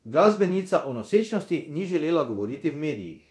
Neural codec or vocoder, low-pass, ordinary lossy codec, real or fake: codec, 24 kHz, 0.9 kbps, DualCodec; none; none; fake